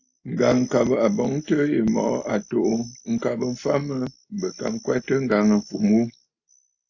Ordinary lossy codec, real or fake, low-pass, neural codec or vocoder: AAC, 48 kbps; real; 7.2 kHz; none